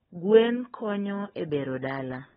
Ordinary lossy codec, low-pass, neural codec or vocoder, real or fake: AAC, 16 kbps; 7.2 kHz; codec, 16 kHz, 16 kbps, FunCodec, trained on LibriTTS, 50 frames a second; fake